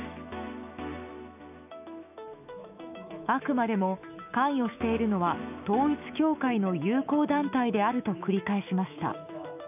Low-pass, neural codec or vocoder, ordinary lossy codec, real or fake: 3.6 kHz; autoencoder, 48 kHz, 128 numbers a frame, DAC-VAE, trained on Japanese speech; none; fake